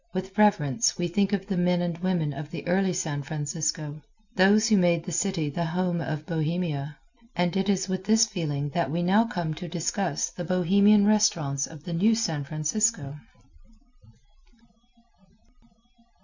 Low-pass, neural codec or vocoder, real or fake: 7.2 kHz; none; real